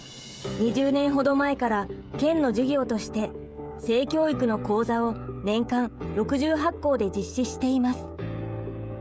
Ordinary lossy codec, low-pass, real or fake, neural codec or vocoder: none; none; fake; codec, 16 kHz, 16 kbps, FreqCodec, smaller model